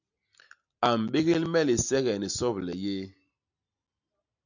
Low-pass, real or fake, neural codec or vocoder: 7.2 kHz; real; none